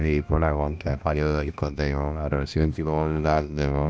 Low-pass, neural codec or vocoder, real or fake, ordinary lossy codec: none; codec, 16 kHz, 1 kbps, X-Codec, HuBERT features, trained on balanced general audio; fake; none